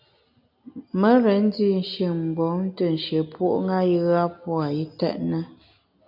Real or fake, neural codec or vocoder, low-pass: real; none; 5.4 kHz